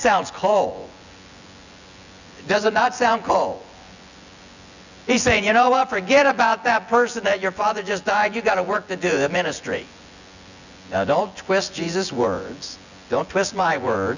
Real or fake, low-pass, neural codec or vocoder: fake; 7.2 kHz; vocoder, 24 kHz, 100 mel bands, Vocos